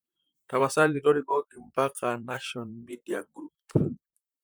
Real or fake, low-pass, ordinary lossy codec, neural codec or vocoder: fake; none; none; vocoder, 44.1 kHz, 128 mel bands, Pupu-Vocoder